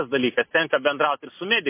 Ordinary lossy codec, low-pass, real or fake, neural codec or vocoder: MP3, 24 kbps; 3.6 kHz; real; none